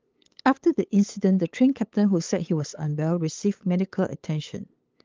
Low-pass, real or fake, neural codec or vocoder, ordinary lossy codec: 7.2 kHz; fake; codec, 16 kHz, 8 kbps, FunCodec, trained on LibriTTS, 25 frames a second; Opus, 32 kbps